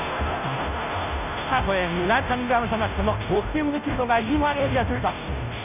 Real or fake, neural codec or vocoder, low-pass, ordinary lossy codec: fake; codec, 16 kHz, 0.5 kbps, FunCodec, trained on Chinese and English, 25 frames a second; 3.6 kHz; none